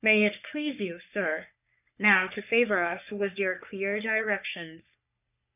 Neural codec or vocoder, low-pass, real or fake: codec, 44.1 kHz, 3.4 kbps, Pupu-Codec; 3.6 kHz; fake